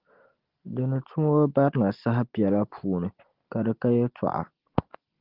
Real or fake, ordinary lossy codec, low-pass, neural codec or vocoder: real; Opus, 16 kbps; 5.4 kHz; none